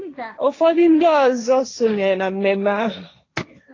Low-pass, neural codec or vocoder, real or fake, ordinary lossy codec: 7.2 kHz; codec, 16 kHz, 1.1 kbps, Voila-Tokenizer; fake; AAC, 32 kbps